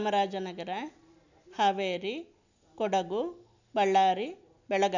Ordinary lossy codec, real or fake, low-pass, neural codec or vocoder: none; real; 7.2 kHz; none